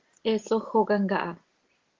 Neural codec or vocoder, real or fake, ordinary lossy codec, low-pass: none; real; Opus, 16 kbps; 7.2 kHz